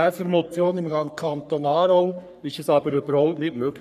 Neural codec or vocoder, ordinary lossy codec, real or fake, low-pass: codec, 44.1 kHz, 3.4 kbps, Pupu-Codec; none; fake; 14.4 kHz